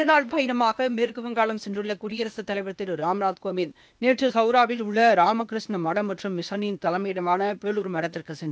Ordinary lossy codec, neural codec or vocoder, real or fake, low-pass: none; codec, 16 kHz, 0.8 kbps, ZipCodec; fake; none